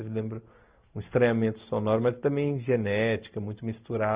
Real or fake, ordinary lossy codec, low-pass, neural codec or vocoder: real; Opus, 64 kbps; 3.6 kHz; none